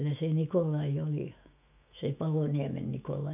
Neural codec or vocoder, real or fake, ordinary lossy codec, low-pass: autoencoder, 48 kHz, 128 numbers a frame, DAC-VAE, trained on Japanese speech; fake; none; 3.6 kHz